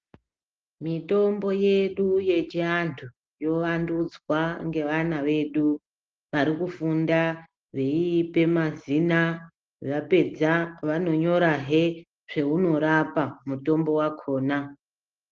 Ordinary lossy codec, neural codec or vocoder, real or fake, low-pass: Opus, 16 kbps; none; real; 7.2 kHz